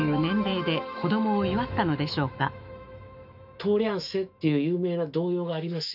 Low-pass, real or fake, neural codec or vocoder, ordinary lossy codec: 5.4 kHz; fake; autoencoder, 48 kHz, 128 numbers a frame, DAC-VAE, trained on Japanese speech; none